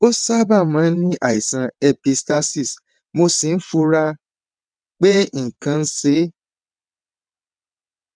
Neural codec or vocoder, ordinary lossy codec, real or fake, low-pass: vocoder, 22.05 kHz, 80 mel bands, WaveNeXt; none; fake; 9.9 kHz